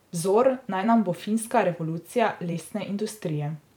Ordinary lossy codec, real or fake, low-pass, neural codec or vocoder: none; fake; 19.8 kHz; vocoder, 44.1 kHz, 128 mel bands every 512 samples, BigVGAN v2